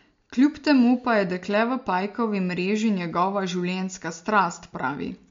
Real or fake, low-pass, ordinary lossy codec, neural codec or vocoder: real; 7.2 kHz; MP3, 48 kbps; none